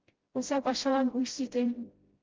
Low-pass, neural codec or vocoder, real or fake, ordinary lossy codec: 7.2 kHz; codec, 16 kHz, 0.5 kbps, FreqCodec, smaller model; fake; Opus, 16 kbps